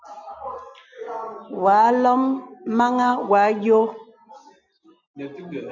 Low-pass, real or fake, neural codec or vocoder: 7.2 kHz; real; none